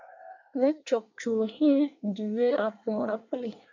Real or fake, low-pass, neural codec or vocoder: fake; 7.2 kHz; codec, 24 kHz, 1 kbps, SNAC